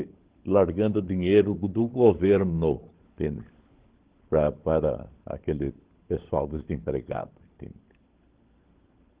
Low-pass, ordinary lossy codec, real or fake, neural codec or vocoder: 3.6 kHz; Opus, 16 kbps; fake; codec, 16 kHz, 16 kbps, FunCodec, trained on LibriTTS, 50 frames a second